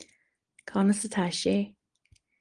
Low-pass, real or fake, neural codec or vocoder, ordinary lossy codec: 9.9 kHz; real; none; Opus, 24 kbps